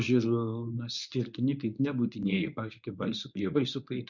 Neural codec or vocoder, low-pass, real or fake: codec, 24 kHz, 0.9 kbps, WavTokenizer, medium speech release version 1; 7.2 kHz; fake